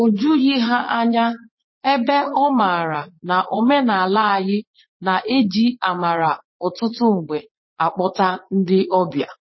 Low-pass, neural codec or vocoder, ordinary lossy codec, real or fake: 7.2 kHz; vocoder, 44.1 kHz, 80 mel bands, Vocos; MP3, 24 kbps; fake